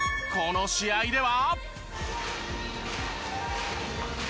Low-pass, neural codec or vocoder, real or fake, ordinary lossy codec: none; none; real; none